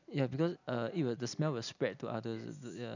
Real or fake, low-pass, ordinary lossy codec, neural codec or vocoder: real; 7.2 kHz; none; none